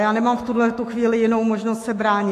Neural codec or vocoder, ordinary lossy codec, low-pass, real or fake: autoencoder, 48 kHz, 128 numbers a frame, DAC-VAE, trained on Japanese speech; AAC, 48 kbps; 14.4 kHz; fake